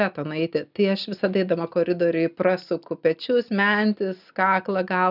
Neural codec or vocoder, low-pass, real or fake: none; 5.4 kHz; real